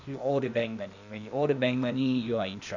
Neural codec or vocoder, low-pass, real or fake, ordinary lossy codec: codec, 16 kHz, 0.8 kbps, ZipCodec; 7.2 kHz; fake; none